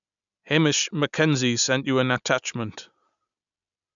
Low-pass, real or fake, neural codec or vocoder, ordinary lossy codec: 7.2 kHz; real; none; none